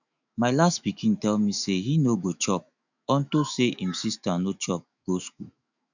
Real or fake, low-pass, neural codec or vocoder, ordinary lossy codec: fake; 7.2 kHz; autoencoder, 48 kHz, 128 numbers a frame, DAC-VAE, trained on Japanese speech; none